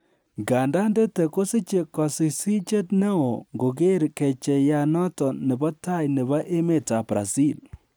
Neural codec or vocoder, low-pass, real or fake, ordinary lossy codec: none; none; real; none